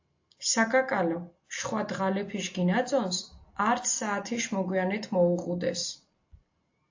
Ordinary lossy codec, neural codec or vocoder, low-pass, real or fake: AAC, 48 kbps; none; 7.2 kHz; real